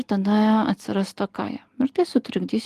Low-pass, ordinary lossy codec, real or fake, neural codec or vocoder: 14.4 kHz; Opus, 24 kbps; real; none